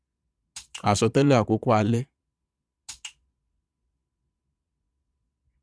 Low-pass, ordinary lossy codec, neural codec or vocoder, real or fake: none; none; vocoder, 22.05 kHz, 80 mel bands, Vocos; fake